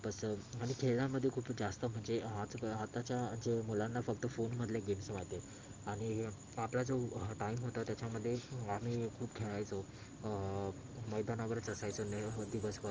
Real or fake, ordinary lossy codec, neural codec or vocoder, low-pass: real; Opus, 24 kbps; none; 7.2 kHz